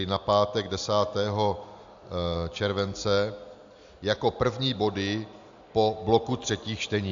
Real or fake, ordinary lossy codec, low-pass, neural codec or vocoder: real; AAC, 64 kbps; 7.2 kHz; none